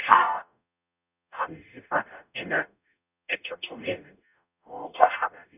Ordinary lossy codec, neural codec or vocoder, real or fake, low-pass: none; codec, 44.1 kHz, 0.9 kbps, DAC; fake; 3.6 kHz